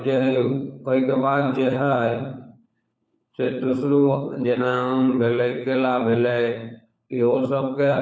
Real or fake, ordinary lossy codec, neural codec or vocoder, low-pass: fake; none; codec, 16 kHz, 4 kbps, FunCodec, trained on LibriTTS, 50 frames a second; none